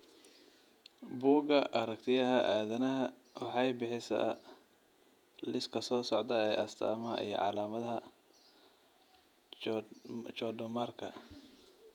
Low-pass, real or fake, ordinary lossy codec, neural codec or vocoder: 19.8 kHz; fake; none; vocoder, 48 kHz, 128 mel bands, Vocos